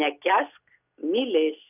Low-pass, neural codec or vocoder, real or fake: 3.6 kHz; none; real